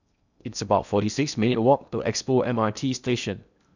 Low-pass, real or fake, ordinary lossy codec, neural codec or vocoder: 7.2 kHz; fake; none; codec, 16 kHz in and 24 kHz out, 0.8 kbps, FocalCodec, streaming, 65536 codes